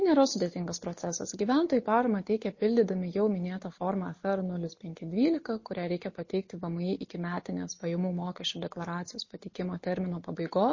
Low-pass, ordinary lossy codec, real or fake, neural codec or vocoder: 7.2 kHz; MP3, 32 kbps; real; none